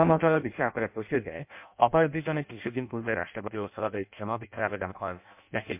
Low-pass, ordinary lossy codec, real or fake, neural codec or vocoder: 3.6 kHz; MP3, 32 kbps; fake; codec, 16 kHz in and 24 kHz out, 0.6 kbps, FireRedTTS-2 codec